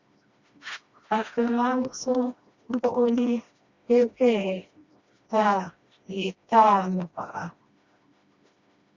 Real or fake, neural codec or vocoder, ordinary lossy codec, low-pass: fake; codec, 16 kHz, 1 kbps, FreqCodec, smaller model; Opus, 64 kbps; 7.2 kHz